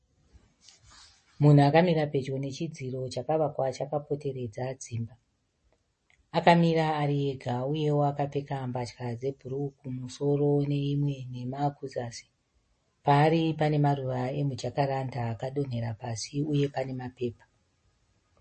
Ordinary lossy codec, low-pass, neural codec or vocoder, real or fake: MP3, 32 kbps; 9.9 kHz; none; real